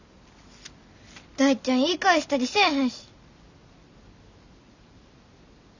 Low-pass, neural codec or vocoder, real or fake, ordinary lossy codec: 7.2 kHz; none; real; none